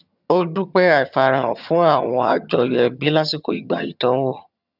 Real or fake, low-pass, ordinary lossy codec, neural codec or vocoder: fake; 5.4 kHz; none; vocoder, 22.05 kHz, 80 mel bands, HiFi-GAN